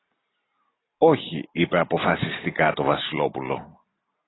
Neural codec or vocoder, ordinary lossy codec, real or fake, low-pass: none; AAC, 16 kbps; real; 7.2 kHz